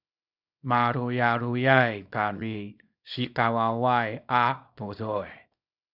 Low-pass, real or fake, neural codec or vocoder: 5.4 kHz; fake; codec, 24 kHz, 0.9 kbps, WavTokenizer, small release